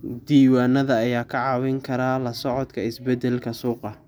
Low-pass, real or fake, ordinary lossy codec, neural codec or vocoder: none; real; none; none